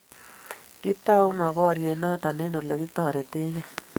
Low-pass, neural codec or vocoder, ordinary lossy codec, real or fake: none; codec, 44.1 kHz, 2.6 kbps, SNAC; none; fake